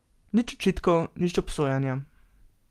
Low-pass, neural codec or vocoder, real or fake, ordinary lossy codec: 14.4 kHz; none; real; Opus, 24 kbps